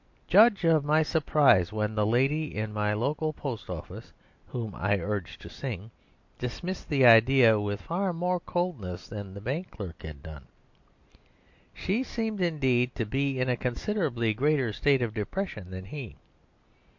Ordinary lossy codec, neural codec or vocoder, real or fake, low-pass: MP3, 48 kbps; none; real; 7.2 kHz